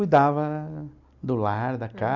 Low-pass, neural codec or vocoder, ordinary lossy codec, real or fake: 7.2 kHz; none; none; real